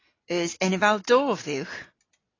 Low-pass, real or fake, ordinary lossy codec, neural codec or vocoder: 7.2 kHz; real; AAC, 32 kbps; none